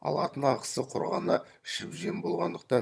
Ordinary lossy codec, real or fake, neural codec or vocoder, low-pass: none; fake; vocoder, 22.05 kHz, 80 mel bands, HiFi-GAN; none